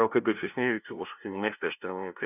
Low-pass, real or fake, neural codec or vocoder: 3.6 kHz; fake; codec, 16 kHz, 0.5 kbps, FunCodec, trained on LibriTTS, 25 frames a second